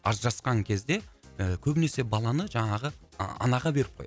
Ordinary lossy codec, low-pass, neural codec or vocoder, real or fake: none; none; none; real